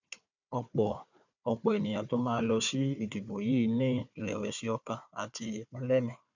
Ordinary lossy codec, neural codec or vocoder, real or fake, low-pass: none; codec, 16 kHz, 4 kbps, FunCodec, trained on Chinese and English, 50 frames a second; fake; 7.2 kHz